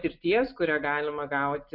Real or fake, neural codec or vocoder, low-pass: real; none; 5.4 kHz